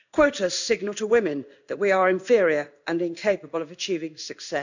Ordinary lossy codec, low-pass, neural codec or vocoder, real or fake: none; 7.2 kHz; codec, 16 kHz in and 24 kHz out, 1 kbps, XY-Tokenizer; fake